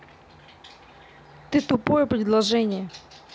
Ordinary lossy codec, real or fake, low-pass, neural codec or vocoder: none; real; none; none